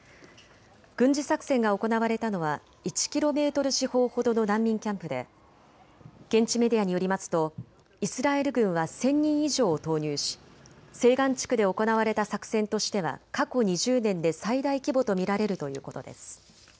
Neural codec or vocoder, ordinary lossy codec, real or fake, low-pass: none; none; real; none